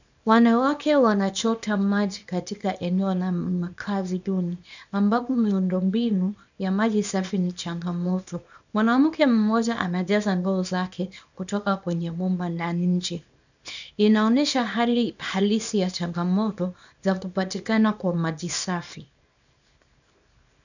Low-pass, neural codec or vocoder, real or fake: 7.2 kHz; codec, 24 kHz, 0.9 kbps, WavTokenizer, small release; fake